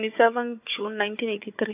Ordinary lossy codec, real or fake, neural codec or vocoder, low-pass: AAC, 32 kbps; fake; codec, 16 kHz, 4 kbps, FunCodec, trained on Chinese and English, 50 frames a second; 3.6 kHz